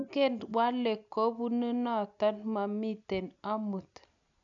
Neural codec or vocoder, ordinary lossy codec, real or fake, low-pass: none; none; real; 7.2 kHz